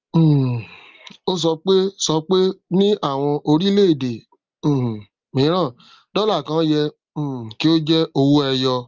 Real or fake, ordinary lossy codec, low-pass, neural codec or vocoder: real; Opus, 32 kbps; 7.2 kHz; none